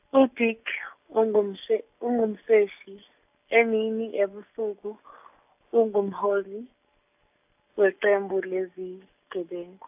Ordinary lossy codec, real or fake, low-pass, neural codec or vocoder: AAC, 32 kbps; real; 3.6 kHz; none